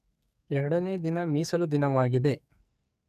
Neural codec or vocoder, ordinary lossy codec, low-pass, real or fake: codec, 44.1 kHz, 2.6 kbps, SNAC; none; 14.4 kHz; fake